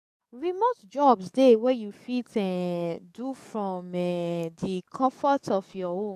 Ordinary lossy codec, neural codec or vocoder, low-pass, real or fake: none; codec, 44.1 kHz, 7.8 kbps, DAC; 14.4 kHz; fake